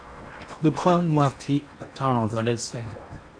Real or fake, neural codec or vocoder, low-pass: fake; codec, 16 kHz in and 24 kHz out, 0.8 kbps, FocalCodec, streaming, 65536 codes; 9.9 kHz